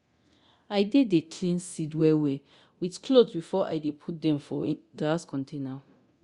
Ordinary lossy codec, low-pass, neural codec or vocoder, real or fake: Opus, 64 kbps; 10.8 kHz; codec, 24 kHz, 0.9 kbps, DualCodec; fake